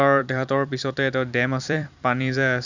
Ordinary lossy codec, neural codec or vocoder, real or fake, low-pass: none; vocoder, 44.1 kHz, 128 mel bands every 256 samples, BigVGAN v2; fake; 7.2 kHz